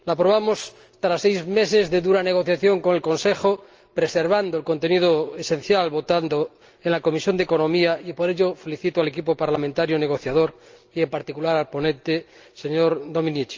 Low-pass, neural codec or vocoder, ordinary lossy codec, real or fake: 7.2 kHz; none; Opus, 24 kbps; real